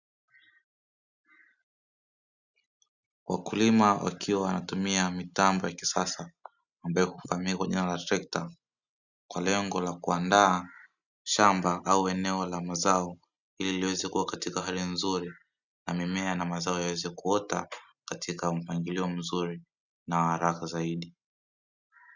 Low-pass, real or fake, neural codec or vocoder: 7.2 kHz; real; none